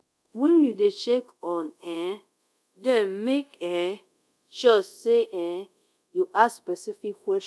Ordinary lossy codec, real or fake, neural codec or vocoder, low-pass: none; fake; codec, 24 kHz, 0.5 kbps, DualCodec; none